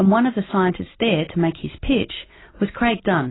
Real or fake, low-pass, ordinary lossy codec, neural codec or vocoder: real; 7.2 kHz; AAC, 16 kbps; none